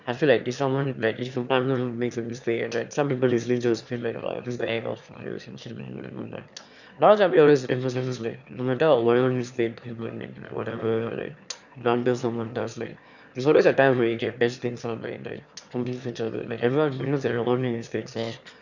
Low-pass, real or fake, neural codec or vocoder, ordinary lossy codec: 7.2 kHz; fake; autoencoder, 22.05 kHz, a latent of 192 numbers a frame, VITS, trained on one speaker; none